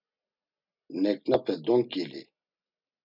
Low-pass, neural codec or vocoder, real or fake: 5.4 kHz; none; real